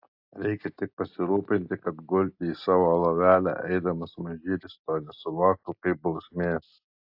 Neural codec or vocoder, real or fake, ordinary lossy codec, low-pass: none; real; AAC, 48 kbps; 5.4 kHz